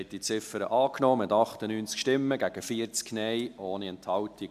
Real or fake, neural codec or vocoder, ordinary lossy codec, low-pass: real; none; MP3, 96 kbps; 14.4 kHz